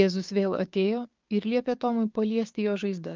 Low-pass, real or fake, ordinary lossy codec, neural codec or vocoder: 7.2 kHz; fake; Opus, 32 kbps; codec, 16 kHz, 6 kbps, DAC